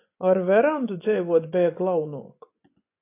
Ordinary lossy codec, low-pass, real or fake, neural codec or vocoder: AAC, 24 kbps; 3.6 kHz; fake; vocoder, 24 kHz, 100 mel bands, Vocos